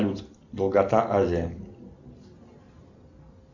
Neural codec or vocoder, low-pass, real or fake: none; 7.2 kHz; real